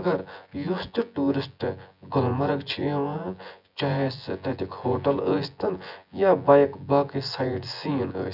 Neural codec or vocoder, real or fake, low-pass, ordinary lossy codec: vocoder, 24 kHz, 100 mel bands, Vocos; fake; 5.4 kHz; none